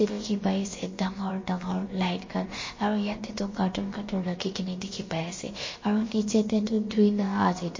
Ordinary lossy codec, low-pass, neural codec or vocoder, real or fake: MP3, 32 kbps; 7.2 kHz; codec, 16 kHz, about 1 kbps, DyCAST, with the encoder's durations; fake